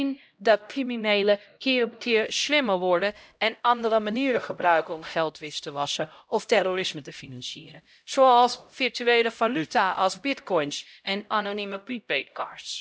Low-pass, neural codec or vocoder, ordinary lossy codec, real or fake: none; codec, 16 kHz, 0.5 kbps, X-Codec, HuBERT features, trained on LibriSpeech; none; fake